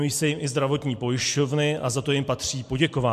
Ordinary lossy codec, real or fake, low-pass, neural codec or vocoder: MP3, 64 kbps; real; 14.4 kHz; none